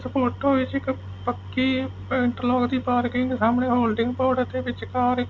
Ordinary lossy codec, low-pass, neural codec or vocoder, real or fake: none; none; none; real